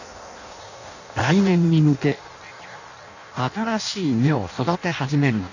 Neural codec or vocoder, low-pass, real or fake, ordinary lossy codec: codec, 16 kHz in and 24 kHz out, 0.6 kbps, FireRedTTS-2 codec; 7.2 kHz; fake; none